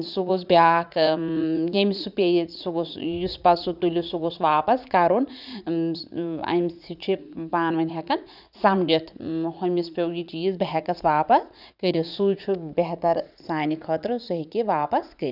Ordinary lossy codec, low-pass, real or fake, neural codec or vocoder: none; 5.4 kHz; fake; vocoder, 44.1 kHz, 80 mel bands, Vocos